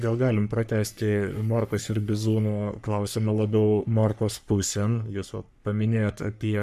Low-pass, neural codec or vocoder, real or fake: 14.4 kHz; codec, 44.1 kHz, 3.4 kbps, Pupu-Codec; fake